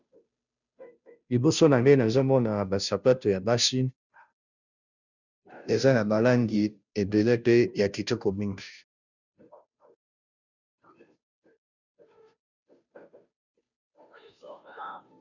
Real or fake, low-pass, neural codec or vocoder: fake; 7.2 kHz; codec, 16 kHz, 0.5 kbps, FunCodec, trained on Chinese and English, 25 frames a second